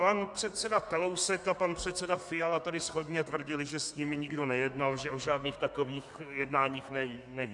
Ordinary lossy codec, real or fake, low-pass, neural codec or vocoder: MP3, 96 kbps; fake; 10.8 kHz; codec, 32 kHz, 1.9 kbps, SNAC